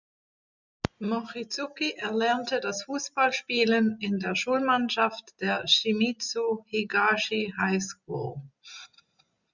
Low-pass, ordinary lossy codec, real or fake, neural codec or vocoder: 7.2 kHz; Opus, 64 kbps; real; none